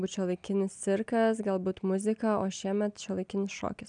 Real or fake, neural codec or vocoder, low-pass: real; none; 9.9 kHz